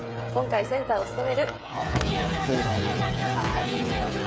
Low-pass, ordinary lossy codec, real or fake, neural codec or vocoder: none; none; fake; codec, 16 kHz, 8 kbps, FreqCodec, smaller model